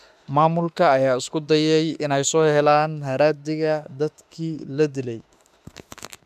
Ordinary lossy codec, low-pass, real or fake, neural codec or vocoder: none; 14.4 kHz; fake; autoencoder, 48 kHz, 32 numbers a frame, DAC-VAE, trained on Japanese speech